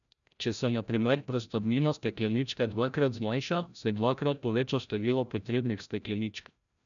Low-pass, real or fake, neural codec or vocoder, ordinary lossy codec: 7.2 kHz; fake; codec, 16 kHz, 0.5 kbps, FreqCodec, larger model; none